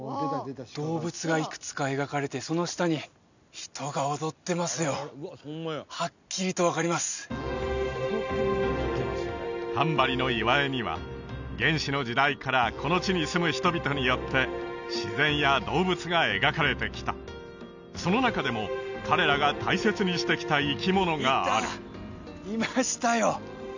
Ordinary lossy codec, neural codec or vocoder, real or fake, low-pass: none; none; real; 7.2 kHz